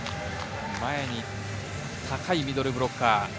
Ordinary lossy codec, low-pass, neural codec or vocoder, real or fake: none; none; none; real